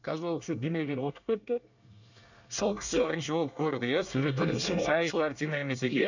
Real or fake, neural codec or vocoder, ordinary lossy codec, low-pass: fake; codec, 24 kHz, 1 kbps, SNAC; none; 7.2 kHz